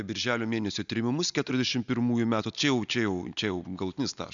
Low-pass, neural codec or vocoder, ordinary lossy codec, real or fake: 7.2 kHz; none; MP3, 96 kbps; real